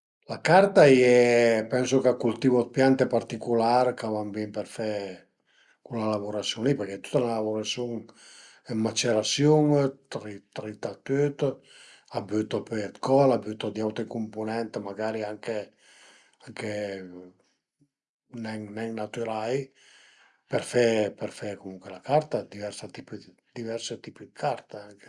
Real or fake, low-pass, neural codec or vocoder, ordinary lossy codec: real; 10.8 kHz; none; Opus, 64 kbps